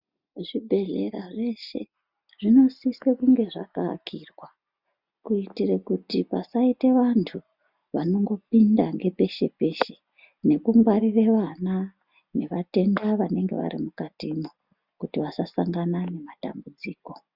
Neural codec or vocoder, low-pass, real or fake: none; 5.4 kHz; real